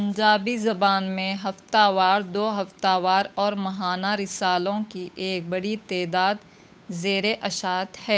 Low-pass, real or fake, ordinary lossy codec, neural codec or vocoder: none; fake; none; codec, 16 kHz, 8 kbps, FunCodec, trained on Chinese and English, 25 frames a second